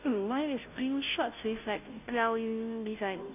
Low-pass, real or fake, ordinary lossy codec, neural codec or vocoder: 3.6 kHz; fake; none; codec, 16 kHz, 0.5 kbps, FunCodec, trained on Chinese and English, 25 frames a second